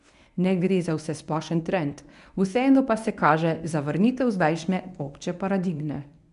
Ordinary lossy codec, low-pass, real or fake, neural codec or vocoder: none; 10.8 kHz; fake; codec, 24 kHz, 0.9 kbps, WavTokenizer, medium speech release version 1